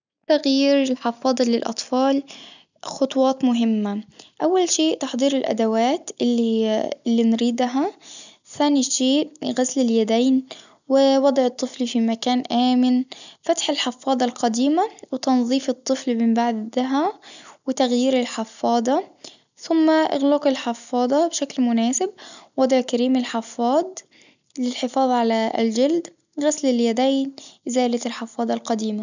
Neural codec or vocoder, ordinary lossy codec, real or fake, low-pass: none; none; real; 7.2 kHz